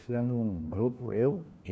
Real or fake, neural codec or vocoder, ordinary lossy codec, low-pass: fake; codec, 16 kHz, 1 kbps, FunCodec, trained on LibriTTS, 50 frames a second; none; none